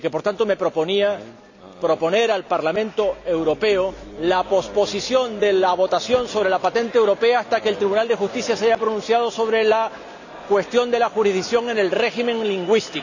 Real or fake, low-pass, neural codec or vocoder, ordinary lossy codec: real; 7.2 kHz; none; none